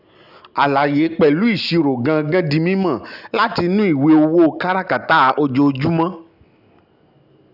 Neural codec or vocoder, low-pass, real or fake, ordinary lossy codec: none; 5.4 kHz; real; none